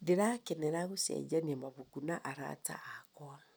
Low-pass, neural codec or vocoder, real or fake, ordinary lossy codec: none; none; real; none